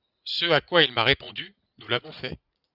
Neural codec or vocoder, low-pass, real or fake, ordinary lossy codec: vocoder, 44.1 kHz, 80 mel bands, Vocos; 5.4 kHz; fake; Opus, 64 kbps